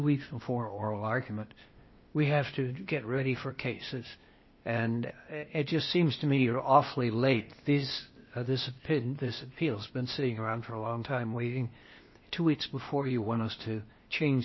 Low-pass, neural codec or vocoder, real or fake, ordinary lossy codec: 7.2 kHz; codec, 16 kHz, 0.8 kbps, ZipCodec; fake; MP3, 24 kbps